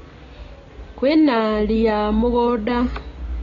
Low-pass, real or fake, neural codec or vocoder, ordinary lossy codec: 7.2 kHz; real; none; AAC, 32 kbps